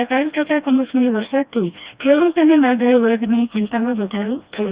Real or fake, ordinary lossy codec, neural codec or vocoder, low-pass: fake; Opus, 64 kbps; codec, 16 kHz, 1 kbps, FreqCodec, smaller model; 3.6 kHz